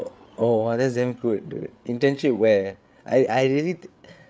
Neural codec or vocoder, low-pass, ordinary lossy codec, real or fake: codec, 16 kHz, 8 kbps, FreqCodec, larger model; none; none; fake